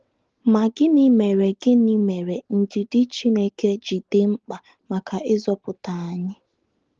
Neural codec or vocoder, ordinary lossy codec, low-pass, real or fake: none; Opus, 16 kbps; 7.2 kHz; real